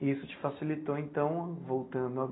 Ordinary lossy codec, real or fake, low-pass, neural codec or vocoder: AAC, 16 kbps; real; 7.2 kHz; none